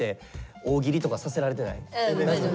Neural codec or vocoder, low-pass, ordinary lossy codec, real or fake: none; none; none; real